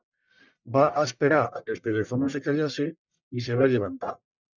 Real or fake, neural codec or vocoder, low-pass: fake; codec, 44.1 kHz, 1.7 kbps, Pupu-Codec; 7.2 kHz